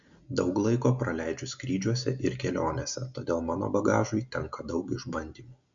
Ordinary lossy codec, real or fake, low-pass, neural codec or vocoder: MP3, 64 kbps; real; 7.2 kHz; none